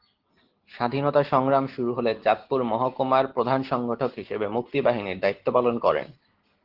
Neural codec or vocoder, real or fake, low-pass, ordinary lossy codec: none; real; 5.4 kHz; Opus, 16 kbps